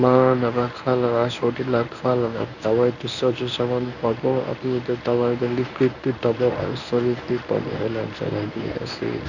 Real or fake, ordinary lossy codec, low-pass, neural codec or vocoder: fake; none; 7.2 kHz; codec, 24 kHz, 0.9 kbps, WavTokenizer, medium speech release version 1